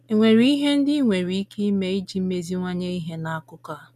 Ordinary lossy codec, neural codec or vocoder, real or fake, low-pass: none; none; real; 14.4 kHz